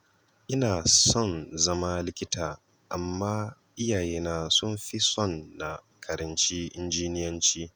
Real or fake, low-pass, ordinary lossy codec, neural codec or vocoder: fake; none; none; vocoder, 48 kHz, 128 mel bands, Vocos